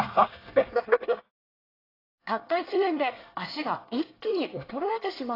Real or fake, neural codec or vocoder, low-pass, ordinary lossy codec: fake; codec, 24 kHz, 1 kbps, SNAC; 5.4 kHz; AAC, 24 kbps